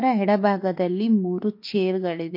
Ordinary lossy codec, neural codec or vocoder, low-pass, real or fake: MP3, 32 kbps; autoencoder, 48 kHz, 32 numbers a frame, DAC-VAE, trained on Japanese speech; 5.4 kHz; fake